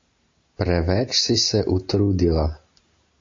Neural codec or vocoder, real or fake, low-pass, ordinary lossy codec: none; real; 7.2 kHz; AAC, 48 kbps